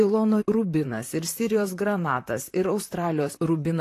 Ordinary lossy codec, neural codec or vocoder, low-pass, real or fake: AAC, 48 kbps; vocoder, 44.1 kHz, 128 mel bands, Pupu-Vocoder; 14.4 kHz; fake